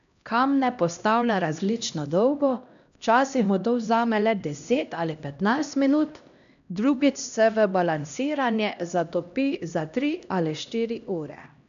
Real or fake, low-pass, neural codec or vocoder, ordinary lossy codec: fake; 7.2 kHz; codec, 16 kHz, 1 kbps, X-Codec, HuBERT features, trained on LibriSpeech; none